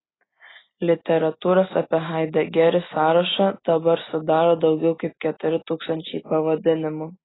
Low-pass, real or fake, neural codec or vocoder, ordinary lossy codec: 7.2 kHz; real; none; AAC, 16 kbps